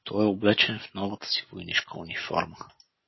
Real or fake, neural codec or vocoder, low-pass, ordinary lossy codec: real; none; 7.2 kHz; MP3, 24 kbps